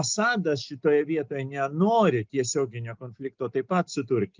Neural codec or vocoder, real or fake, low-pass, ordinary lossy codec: none; real; 7.2 kHz; Opus, 32 kbps